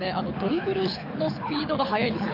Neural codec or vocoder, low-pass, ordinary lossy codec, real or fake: codec, 24 kHz, 6 kbps, HILCodec; 5.4 kHz; AAC, 32 kbps; fake